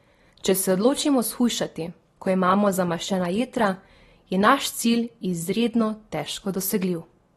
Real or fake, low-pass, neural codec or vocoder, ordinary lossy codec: real; 19.8 kHz; none; AAC, 32 kbps